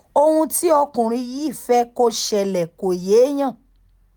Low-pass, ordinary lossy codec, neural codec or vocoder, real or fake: none; none; none; real